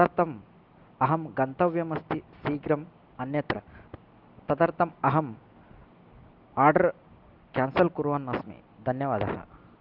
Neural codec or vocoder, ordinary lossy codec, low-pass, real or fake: none; Opus, 32 kbps; 5.4 kHz; real